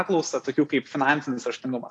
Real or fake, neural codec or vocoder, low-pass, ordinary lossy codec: real; none; 10.8 kHz; AAC, 48 kbps